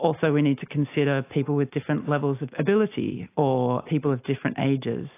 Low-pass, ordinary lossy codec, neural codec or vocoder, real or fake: 3.6 kHz; AAC, 24 kbps; none; real